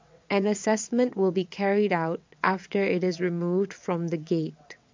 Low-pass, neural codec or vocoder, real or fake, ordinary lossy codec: 7.2 kHz; vocoder, 22.05 kHz, 80 mel bands, WaveNeXt; fake; MP3, 48 kbps